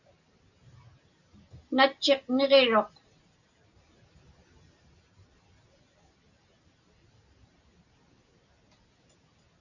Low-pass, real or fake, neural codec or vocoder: 7.2 kHz; real; none